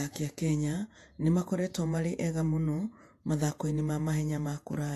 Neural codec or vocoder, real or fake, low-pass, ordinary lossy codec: vocoder, 48 kHz, 128 mel bands, Vocos; fake; 14.4 kHz; AAC, 64 kbps